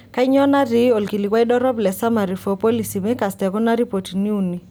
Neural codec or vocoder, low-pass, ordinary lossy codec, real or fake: none; none; none; real